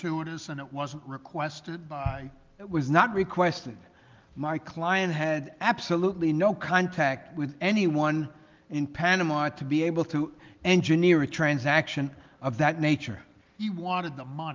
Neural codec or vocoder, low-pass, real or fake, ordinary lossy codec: none; 7.2 kHz; real; Opus, 32 kbps